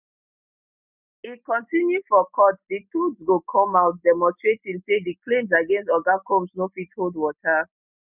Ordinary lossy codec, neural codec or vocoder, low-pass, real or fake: none; none; 3.6 kHz; real